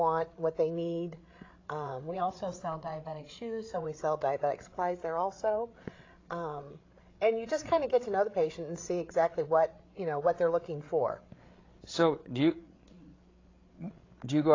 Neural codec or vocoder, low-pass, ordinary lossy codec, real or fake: codec, 16 kHz, 8 kbps, FreqCodec, larger model; 7.2 kHz; AAC, 32 kbps; fake